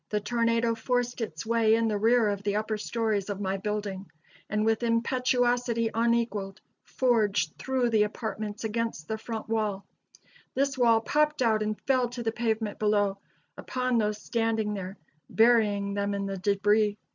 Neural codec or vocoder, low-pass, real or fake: none; 7.2 kHz; real